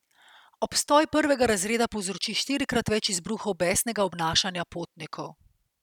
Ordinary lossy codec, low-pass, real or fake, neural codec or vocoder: none; 19.8 kHz; fake; vocoder, 44.1 kHz, 128 mel bands every 256 samples, BigVGAN v2